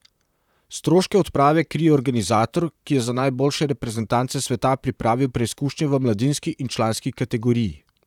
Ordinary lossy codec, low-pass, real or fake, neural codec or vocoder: none; 19.8 kHz; fake; vocoder, 44.1 kHz, 128 mel bands, Pupu-Vocoder